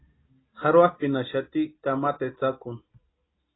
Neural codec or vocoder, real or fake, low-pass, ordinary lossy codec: none; real; 7.2 kHz; AAC, 16 kbps